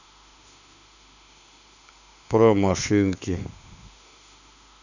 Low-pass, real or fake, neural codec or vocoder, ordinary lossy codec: 7.2 kHz; fake; autoencoder, 48 kHz, 32 numbers a frame, DAC-VAE, trained on Japanese speech; none